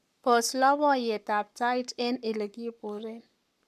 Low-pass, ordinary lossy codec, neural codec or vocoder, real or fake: 14.4 kHz; none; codec, 44.1 kHz, 7.8 kbps, Pupu-Codec; fake